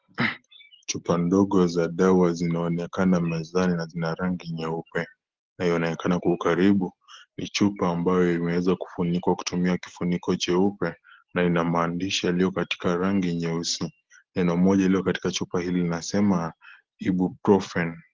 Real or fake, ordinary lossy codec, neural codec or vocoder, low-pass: real; Opus, 16 kbps; none; 7.2 kHz